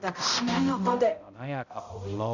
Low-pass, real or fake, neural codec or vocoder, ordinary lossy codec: 7.2 kHz; fake; codec, 16 kHz, 0.5 kbps, X-Codec, HuBERT features, trained on balanced general audio; none